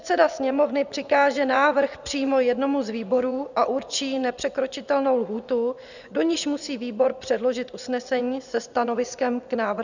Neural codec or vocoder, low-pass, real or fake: vocoder, 24 kHz, 100 mel bands, Vocos; 7.2 kHz; fake